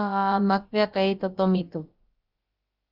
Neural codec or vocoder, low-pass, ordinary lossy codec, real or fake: codec, 16 kHz, about 1 kbps, DyCAST, with the encoder's durations; 5.4 kHz; Opus, 24 kbps; fake